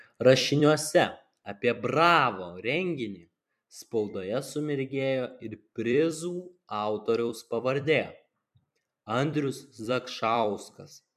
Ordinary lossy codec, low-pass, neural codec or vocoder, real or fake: MP3, 96 kbps; 14.4 kHz; vocoder, 44.1 kHz, 128 mel bands every 256 samples, BigVGAN v2; fake